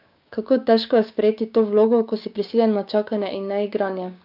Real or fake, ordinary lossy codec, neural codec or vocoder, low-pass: fake; none; codec, 16 kHz, 6 kbps, DAC; 5.4 kHz